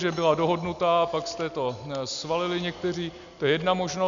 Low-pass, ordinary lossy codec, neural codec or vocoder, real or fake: 7.2 kHz; MP3, 96 kbps; none; real